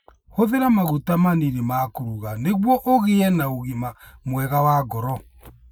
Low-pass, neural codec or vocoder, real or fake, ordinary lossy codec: none; none; real; none